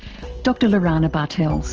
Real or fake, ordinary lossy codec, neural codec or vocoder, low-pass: real; Opus, 16 kbps; none; 7.2 kHz